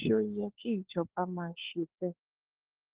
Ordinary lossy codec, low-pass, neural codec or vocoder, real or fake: Opus, 16 kbps; 3.6 kHz; codec, 16 kHz, 4 kbps, FunCodec, trained on LibriTTS, 50 frames a second; fake